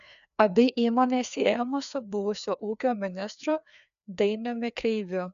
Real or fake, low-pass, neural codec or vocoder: fake; 7.2 kHz; codec, 16 kHz, 2 kbps, FreqCodec, larger model